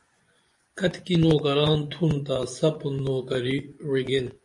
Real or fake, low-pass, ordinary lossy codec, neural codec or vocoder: fake; 10.8 kHz; MP3, 48 kbps; vocoder, 24 kHz, 100 mel bands, Vocos